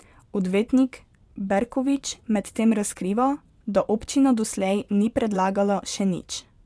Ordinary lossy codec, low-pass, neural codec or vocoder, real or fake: none; none; vocoder, 22.05 kHz, 80 mel bands, WaveNeXt; fake